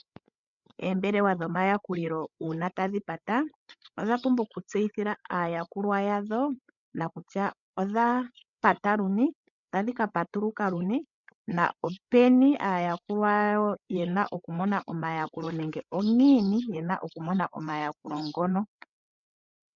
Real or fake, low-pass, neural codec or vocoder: fake; 7.2 kHz; codec, 16 kHz, 8 kbps, FreqCodec, larger model